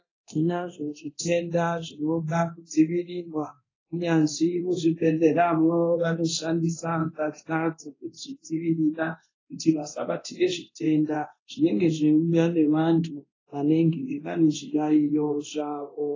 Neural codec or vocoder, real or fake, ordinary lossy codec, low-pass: codec, 24 kHz, 0.9 kbps, DualCodec; fake; AAC, 32 kbps; 7.2 kHz